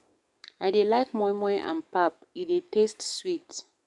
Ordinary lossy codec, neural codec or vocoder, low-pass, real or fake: none; codec, 44.1 kHz, 7.8 kbps, DAC; 10.8 kHz; fake